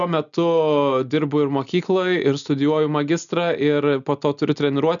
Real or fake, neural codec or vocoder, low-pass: real; none; 7.2 kHz